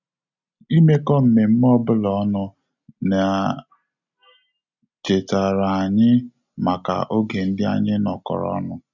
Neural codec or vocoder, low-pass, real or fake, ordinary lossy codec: none; 7.2 kHz; real; none